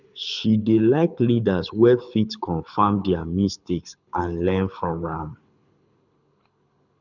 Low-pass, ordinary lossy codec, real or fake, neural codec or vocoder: 7.2 kHz; none; fake; codec, 24 kHz, 6 kbps, HILCodec